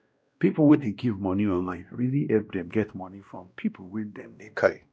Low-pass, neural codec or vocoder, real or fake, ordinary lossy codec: none; codec, 16 kHz, 1 kbps, X-Codec, WavLM features, trained on Multilingual LibriSpeech; fake; none